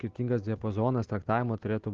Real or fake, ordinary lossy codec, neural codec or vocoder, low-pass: real; Opus, 16 kbps; none; 7.2 kHz